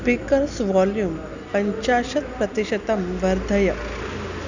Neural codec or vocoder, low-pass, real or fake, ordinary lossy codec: none; 7.2 kHz; real; none